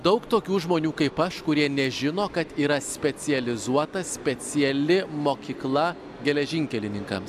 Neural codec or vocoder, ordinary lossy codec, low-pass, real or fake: none; AAC, 96 kbps; 14.4 kHz; real